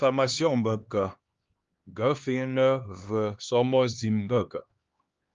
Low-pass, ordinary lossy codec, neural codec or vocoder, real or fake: 7.2 kHz; Opus, 32 kbps; codec, 16 kHz, 2 kbps, X-Codec, HuBERT features, trained on LibriSpeech; fake